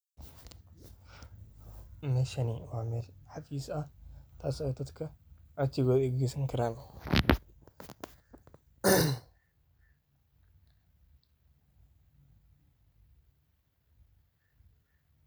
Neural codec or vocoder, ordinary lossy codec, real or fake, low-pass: none; none; real; none